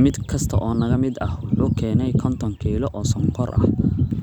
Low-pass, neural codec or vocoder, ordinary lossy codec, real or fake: 19.8 kHz; vocoder, 44.1 kHz, 128 mel bands every 256 samples, BigVGAN v2; none; fake